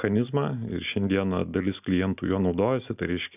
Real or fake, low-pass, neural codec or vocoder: real; 3.6 kHz; none